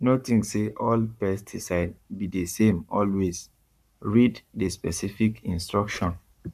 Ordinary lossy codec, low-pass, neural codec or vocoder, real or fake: none; 14.4 kHz; codec, 44.1 kHz, 7.8 kbps, Pupu-Codec; fake